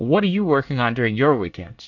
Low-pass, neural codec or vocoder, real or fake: 7.2 kHz; codec, 24 kHz, 1 kbps, SNAC; fake